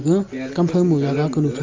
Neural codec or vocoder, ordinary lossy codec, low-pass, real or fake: none; Opus, 32 kbps; 7.2 kHz; real